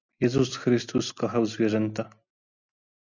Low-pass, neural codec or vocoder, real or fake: 7.2 kHz; none; real